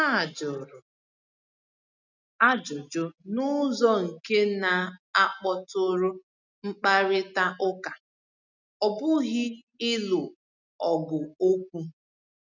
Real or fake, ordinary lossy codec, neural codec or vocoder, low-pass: real; none; none; 7.2 kHz